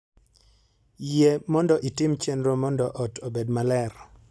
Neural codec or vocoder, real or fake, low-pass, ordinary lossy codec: none; real; none; none